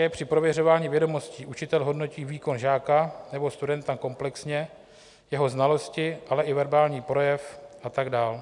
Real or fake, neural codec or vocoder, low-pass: real; none; 10.8 kHz